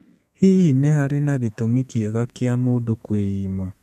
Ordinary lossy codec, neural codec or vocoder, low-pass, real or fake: none; codec, 32 kHz, 1.9 kbps, SNAC; 14.4 kHz; fake